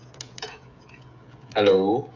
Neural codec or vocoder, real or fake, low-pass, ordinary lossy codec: codec, 16 kHz, 16 kbps, FreqCodec, smaller model; fake; 7.2 kHz; none